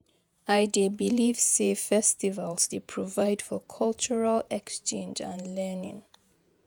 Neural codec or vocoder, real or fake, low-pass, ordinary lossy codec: vocoder, 48 kHz, 128 mel bands, Vocos; fake; none; none